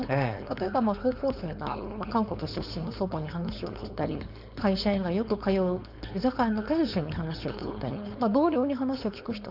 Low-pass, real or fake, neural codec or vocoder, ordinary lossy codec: 5.4 kHz; fake; codec, 16 kHz, 4.8 kbps, FACodec; none